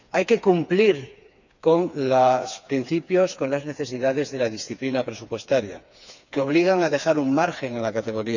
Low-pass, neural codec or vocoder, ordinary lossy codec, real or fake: 7.2 kHz; codec, 16 kHz, 4 kbps, FreqCodec, smaller model; none; fake